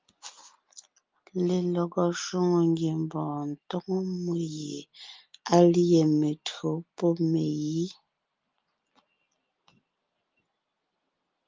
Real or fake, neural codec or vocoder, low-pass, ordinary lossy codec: real; none; 7.2 kHz; Opus, 32 kbps